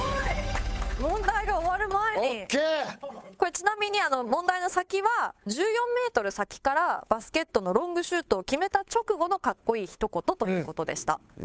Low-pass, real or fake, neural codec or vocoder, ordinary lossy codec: none; fake; codec, 16 kHz, 8 kbps, FunCodec, trained on Chinese and English, 25 frames a second; none